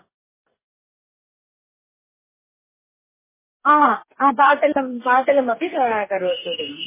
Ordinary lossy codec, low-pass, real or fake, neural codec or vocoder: MP3, 16 kbps; 3.6 kHz; fake; codec, 44.1 kHz, 2.6 kbps, DAC